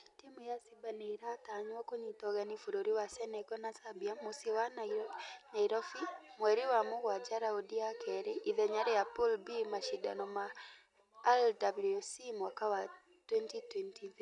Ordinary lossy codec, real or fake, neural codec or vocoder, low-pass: none; real; none; 10.8 kHz